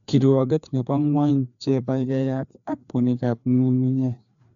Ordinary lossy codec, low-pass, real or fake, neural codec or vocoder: MP3, 96 kbps; 7.2 kHz; fake; codec, 16 kHz, 2 kbps, FreqCodec, larger model